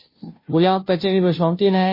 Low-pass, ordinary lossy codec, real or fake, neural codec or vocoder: 5.4 kHz; MP3, 24 kbps; fake; codec, 16 kHz, 0.5 kbps, FunCodec, trained on Chinese and English, 25 frames a second